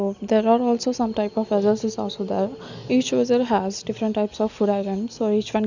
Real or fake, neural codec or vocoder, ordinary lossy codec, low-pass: real; none; none; 7.2 kHz